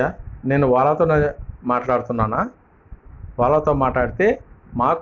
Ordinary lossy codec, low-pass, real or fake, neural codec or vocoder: none; 7.2 kHz; real; none